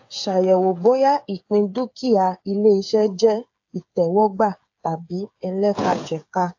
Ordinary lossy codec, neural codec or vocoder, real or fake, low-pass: AAC, 48 kbps; codec, 16 kHz, 8 kbps, FreqCodec, smaller model; fake; 7.2 kHz